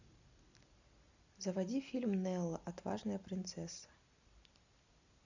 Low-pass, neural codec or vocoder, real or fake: 7.2 kHz; none; real